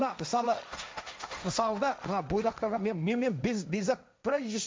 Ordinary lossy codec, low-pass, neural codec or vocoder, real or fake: none; none; codec, 16 kHz, 1.1 kbps, Voila-Tokenizer; fake